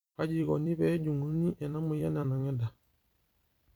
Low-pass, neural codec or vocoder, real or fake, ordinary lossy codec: none; vocoder, 44.1 kHz, 128 mel bands every 256 samples, BigVGAN v2; fake; none